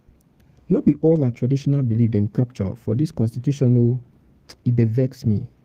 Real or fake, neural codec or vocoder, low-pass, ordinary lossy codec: fake; codec, 32 kHz, 1.9 kbps, SNAC; 14.4 kHz; Opus, 32 kbps